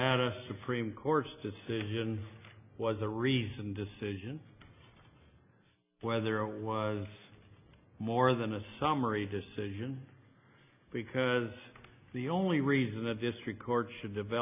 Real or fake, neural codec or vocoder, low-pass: real; none; 3.6 kHz